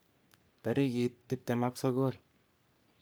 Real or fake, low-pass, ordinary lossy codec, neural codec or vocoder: fake; none; none; codec, 44.1 kHz, 3.4 kbps, Pupu-Codec